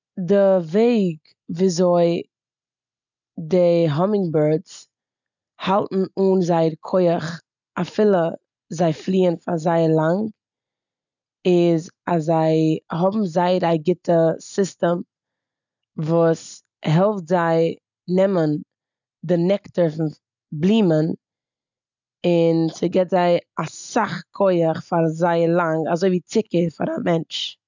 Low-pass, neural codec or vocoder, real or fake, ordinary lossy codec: 7.2 kHz; none; real; none